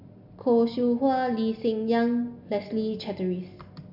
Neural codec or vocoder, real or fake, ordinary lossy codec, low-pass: none; real; none; 5.4 kHz